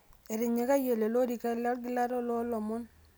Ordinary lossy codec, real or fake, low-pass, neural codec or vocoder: none; real; none; none